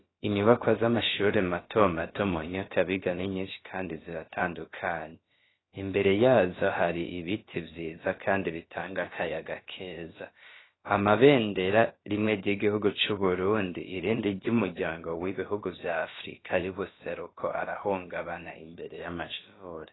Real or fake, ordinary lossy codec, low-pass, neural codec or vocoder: fake; AAC, 16 kbps; 7.2 kHz; codec, 16 kHz, about 1 kbps, DyCAST, with the encoder's durations